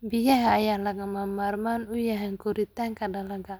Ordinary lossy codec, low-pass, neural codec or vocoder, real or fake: none; none; none; real